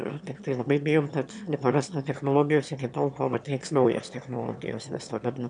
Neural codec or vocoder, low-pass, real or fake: autoencoder, 22.05 kHz, a latent of 192 numbers a frame, VITS, trained on one speaker; 9.9 kHz; fake